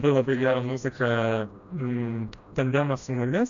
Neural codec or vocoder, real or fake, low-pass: codec, 16 kHz, 1 kbps, FreqCodec, smaller model; fake; 7.2 kHz